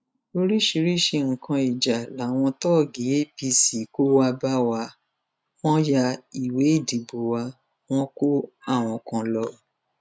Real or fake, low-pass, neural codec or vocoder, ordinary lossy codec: real; none; none; none